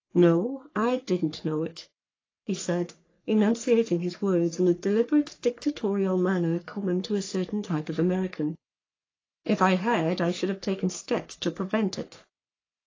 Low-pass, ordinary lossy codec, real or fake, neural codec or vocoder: 7.2 kHz; AAC, 32 kbps; fake; codec, 44.1 kHz, 3.4 kbps, Pupu-Codec